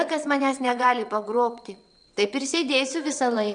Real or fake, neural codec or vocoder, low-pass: fake; vocoder, 22.05 kHz, 80 mel bands, WaveNeXt; 9.9 kHz